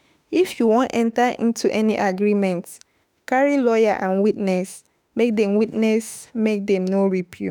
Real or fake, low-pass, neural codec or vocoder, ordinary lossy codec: fake; none; autoencoder, 48 kHz, 32 numbers a frame, DAC-VAE, trained on Japanese speech; none